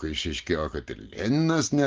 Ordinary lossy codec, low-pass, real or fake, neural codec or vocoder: Opus, 32 kbps; 7.2 kHz; real; none